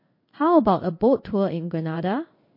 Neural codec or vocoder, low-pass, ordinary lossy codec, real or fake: codec, 16 kHz in and 24 kHz out, 1 kbps, XY-Tokenizer; 5.4 kHz; MP3, 32 kbps; fake